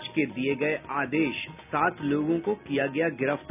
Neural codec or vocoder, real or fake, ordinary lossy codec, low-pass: none; real; none; 3.6 kHz